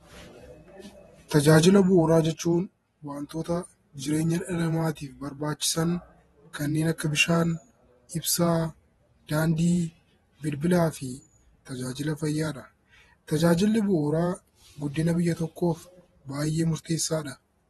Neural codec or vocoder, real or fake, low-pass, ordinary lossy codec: vocoder, 48 kHz, 128 mel bands, Vocos; fake; 19.8 kHz; AAC, 32 kbps